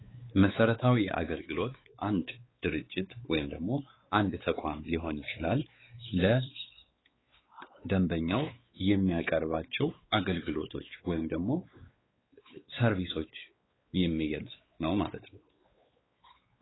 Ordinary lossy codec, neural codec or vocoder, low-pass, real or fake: AAC, 16 kbps; codec, 16 kHz, 4 kbps, X-Codec, WavLM features, trained on Multilingual LibriSpeech; 7.2 kHz; fake